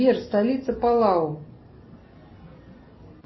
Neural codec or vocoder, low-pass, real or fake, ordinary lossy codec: none; 7.2 kHz; real; MP3, 24 kbps